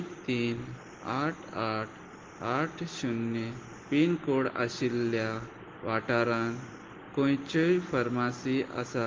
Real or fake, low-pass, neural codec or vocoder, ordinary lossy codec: real; 7.2 kHz; none; Opus, 16 kbps